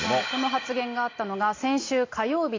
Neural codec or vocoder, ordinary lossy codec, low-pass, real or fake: none; AAC, 32 kbps; 7.2 kHz; real